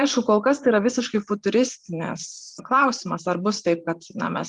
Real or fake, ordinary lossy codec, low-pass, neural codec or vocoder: fake; Opus, 24 kbps; 10.8 kHz; vocoder, 44.1 kHz, 128 mel bands every 512 samples, BigVGAN v2